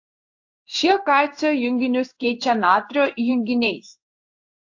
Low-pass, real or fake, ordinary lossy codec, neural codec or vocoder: 7.2 kHz; fake; AAC, 48 kbps; codec, 16 kHz in and 24 kHz out, 1 kbps, XY-Tokenizer